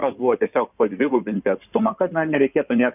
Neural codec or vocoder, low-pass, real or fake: codec, 16 kHz in and 24 kHz out, 2.2 kbps, FireRedTTS-2 codec; 3.6 kHz; fake